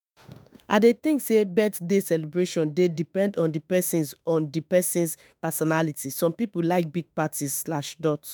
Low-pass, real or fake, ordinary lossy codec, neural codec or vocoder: none; fake; none; autoencoder, 48 kHz, 32 numbers a frame, DAC-VAE, trained on Japanese speech